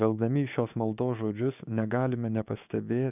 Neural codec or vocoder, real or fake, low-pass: codec, 16 kHz, 6 kbps, DAC; fake; 3.6 kHz